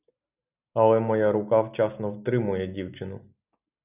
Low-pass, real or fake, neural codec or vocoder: 3.6 kHz; real; none